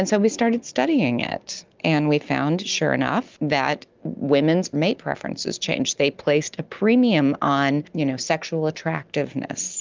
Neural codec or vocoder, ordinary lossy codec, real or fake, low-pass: none; Opus, 32 kbps; real; 7.2 kHz